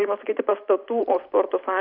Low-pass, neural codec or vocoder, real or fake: 5.4 kHz; vocoder, 44.1 kHz, 128 mel bands every 512 samples, BigVGAN v2; fake